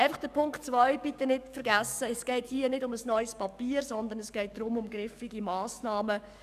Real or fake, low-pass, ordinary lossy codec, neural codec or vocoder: fake; 14.4 kHz; none; codec, 44.1 kHz, 7.8 kbps, DAC